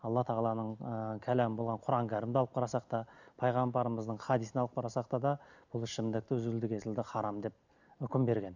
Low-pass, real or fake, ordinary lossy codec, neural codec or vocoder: 7.2 kHz; real; none; none